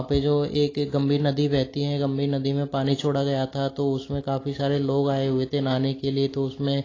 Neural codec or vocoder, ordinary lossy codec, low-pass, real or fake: none; AAC, 32 kbps; 7.2 kHz; real